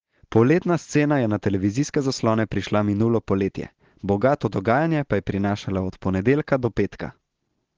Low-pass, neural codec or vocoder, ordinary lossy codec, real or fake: 7.2 kHz; none; Opus, 16 kbps; real